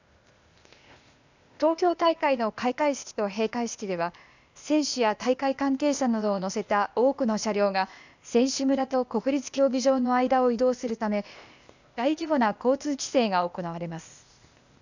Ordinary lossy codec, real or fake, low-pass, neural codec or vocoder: none; fake; 7.2 kHz; codec, 16 kHz, 0.8 kbps, ZipCodec